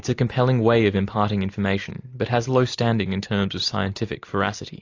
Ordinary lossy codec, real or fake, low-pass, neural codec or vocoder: AAC, 48 kbps; real; 7.2 kHz; none